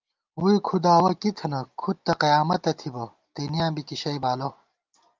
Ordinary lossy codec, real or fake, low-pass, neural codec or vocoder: Opus, 24 kbps; real; 7.2 kHz; none